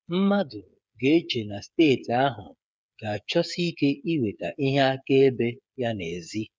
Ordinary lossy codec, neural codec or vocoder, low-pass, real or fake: none; codec, 16 kHz, 8 kbps, FreqCodec, smaller model; none; fake